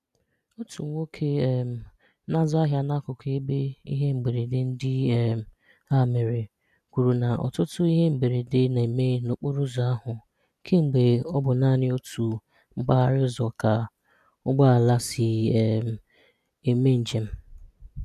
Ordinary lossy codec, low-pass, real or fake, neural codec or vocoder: none; 14.4 kHz; real; none